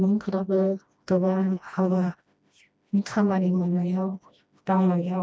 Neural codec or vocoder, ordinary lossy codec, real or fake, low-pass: codec, 16 kHz, 1 kbps, FreqCodec, smaller model; none; fake; none